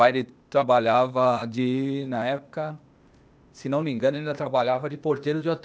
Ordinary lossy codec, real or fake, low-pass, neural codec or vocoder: none; fake; none; codec, 16 kHz, 0.8 kbps, ZipCodec